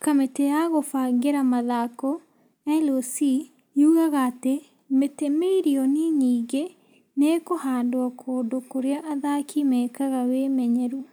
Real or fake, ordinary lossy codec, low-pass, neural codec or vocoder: real; none; none; none